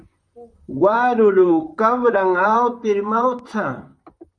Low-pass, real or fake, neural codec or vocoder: 9.9 kHz; fake; vocoder, 44.1 kHz, 128 mel bands, Pupu-Vocoder